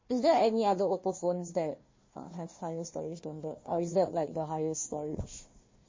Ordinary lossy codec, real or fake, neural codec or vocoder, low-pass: MP3, 32 kbps; fake; codec, 16 kHz, 1 kbps, FunCodec, trained on Chinese and English, 50 frames a second; 7.2 kHz